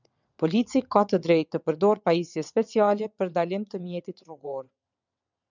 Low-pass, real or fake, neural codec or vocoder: 7.2 kHz; fake; vocoder, 22.05 kHz, 80 mel bands, WaveNeXt